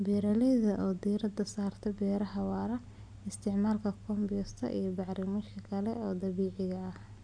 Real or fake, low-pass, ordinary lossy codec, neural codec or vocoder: real; 9.9 kHz; none; none